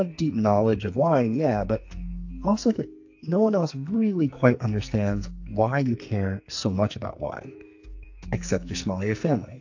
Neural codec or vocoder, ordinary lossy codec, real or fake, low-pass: codec, 44.1 kHz, 2.6 kbps, SNAC; AAC, 48 kbps; fake; 7.2 kHz